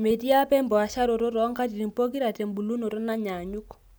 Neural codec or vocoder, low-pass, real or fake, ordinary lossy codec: none; none; real; none